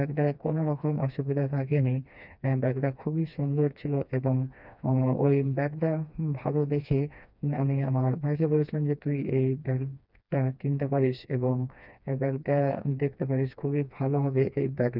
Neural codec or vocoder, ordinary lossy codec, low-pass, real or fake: codec, 16 kHz, 2 kbps, FreqCodec, smaller model; none; 5.4 kHz; fake